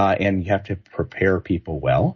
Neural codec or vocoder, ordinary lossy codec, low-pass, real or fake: none; MP3, 32 kbps; 7.2 kHz; real